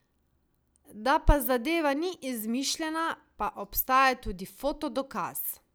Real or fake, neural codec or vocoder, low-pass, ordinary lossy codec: real; none; none; none